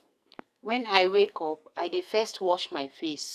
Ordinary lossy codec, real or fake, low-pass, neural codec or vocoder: none; fake; 14.4 kHz; codec, 44.1 kHz, 2.6 kbps, SNAC